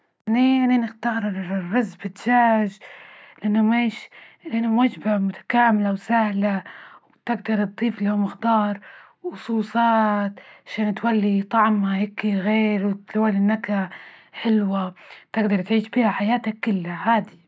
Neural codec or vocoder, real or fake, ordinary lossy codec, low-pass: none; real; none; none